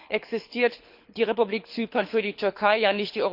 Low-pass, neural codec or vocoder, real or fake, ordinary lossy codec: 5.4 kHz; codec, 24 kHz, 6 kbps, HILCodec; fake; Opus, 64 kbps